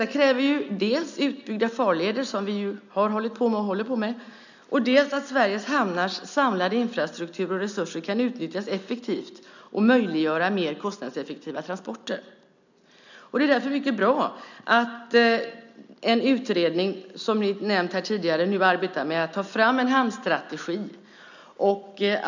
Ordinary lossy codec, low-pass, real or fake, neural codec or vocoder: none; 7.2 kHz; real; none